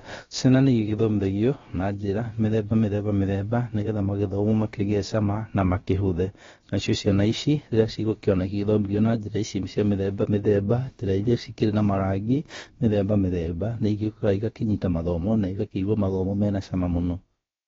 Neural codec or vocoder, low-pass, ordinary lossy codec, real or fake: codec, 16 kHz, about 1 kbps, DyCAST, with the encoder's durations; 7.2 kHz; AAC, 24 kbps; fake